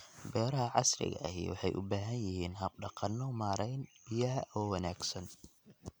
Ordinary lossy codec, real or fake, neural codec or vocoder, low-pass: none; real; none; none